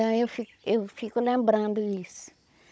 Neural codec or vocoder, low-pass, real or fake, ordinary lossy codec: codec, 16 kHz, 16 kbps, FunCodec, trained on Chinese and English, 50 frames a second; none; fake; none